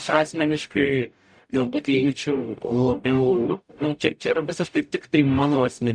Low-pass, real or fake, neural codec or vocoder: 9.9 kHz; fake; codec, 44.1 kHz, 0.9 kbps, DAC